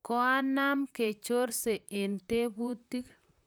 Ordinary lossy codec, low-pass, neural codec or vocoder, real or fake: none; none; vocoder, 44.1 kHz, 128 mel bands, Pupu-Vocoder; fake